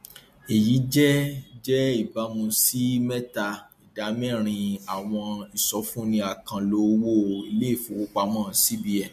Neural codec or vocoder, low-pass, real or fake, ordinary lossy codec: none; 14.4 kHz; real; MP3, 64 kbps